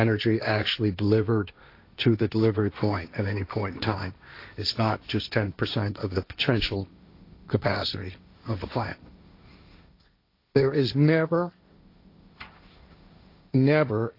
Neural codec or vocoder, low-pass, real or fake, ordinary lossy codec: codec, 16 kHz, 1.1 kbps, Voila-Tokenizer; 5.4 kHz; fake; AAC, 32 kbps